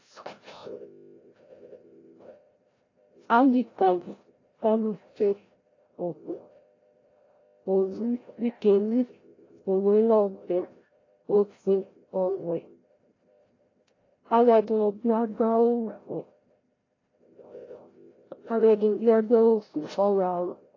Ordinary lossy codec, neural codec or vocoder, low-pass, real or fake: AAC, 32 kbps; codec, 16 kHz, 0.5 kbps, FreqCodec, larger model; 7.2 kHz; fake